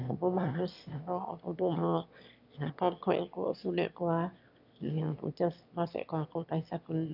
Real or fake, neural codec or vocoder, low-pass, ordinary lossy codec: fake; autoencoder, 22.05 kHz, a latent of 192 numbers a frame, VITS, trained on one speaker; 5.4 kHz; none